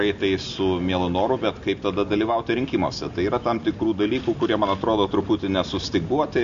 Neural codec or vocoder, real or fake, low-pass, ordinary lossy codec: none; real; 7.2 kHz; MP3, 48 kbps